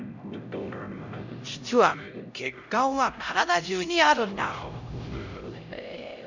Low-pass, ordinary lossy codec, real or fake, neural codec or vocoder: 7.2 kHz; none; fake; codec, 16 kHz, 0.5 kbps, X-Codec, HuBERT features, trained on LibriSpeech